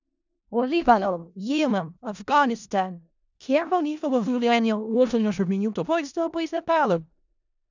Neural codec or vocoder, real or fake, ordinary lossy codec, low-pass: codec, 16 kHz in and 24 kHz out, 0.4 kbps, LongCat-Audio-Codec, four codebook decoder; fake; none; 7.2 kHz